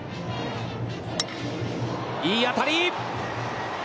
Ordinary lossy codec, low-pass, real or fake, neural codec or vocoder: none; none; real; none